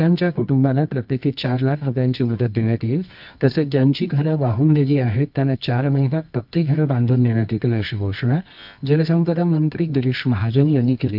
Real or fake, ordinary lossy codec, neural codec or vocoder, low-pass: fake; MP3, 48 kbps; codec, 24 kHz, 0.9 kbps, WavTokenizer, medium music audio release; 5.4 kHz